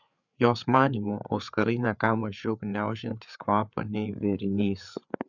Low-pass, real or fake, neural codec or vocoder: 7.2 kHz; fake; codec, 16 kHz, 4 kbps, FreqCodec, larger model